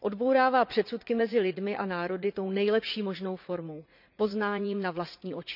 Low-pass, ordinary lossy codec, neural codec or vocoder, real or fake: 5.4 kHz; none; vocoder, 44.1 kHz, 80 mel bands, Vocos; fake